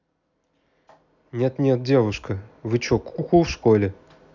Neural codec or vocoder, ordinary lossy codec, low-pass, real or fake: none; none; 7.2 kHz; real